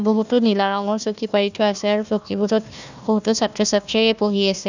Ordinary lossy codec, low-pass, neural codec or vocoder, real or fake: none; 7.2 kHz; codec, 16 kHz, 1 kbps, FunCodec, trained on Chinese and English, 50 frames a second; fake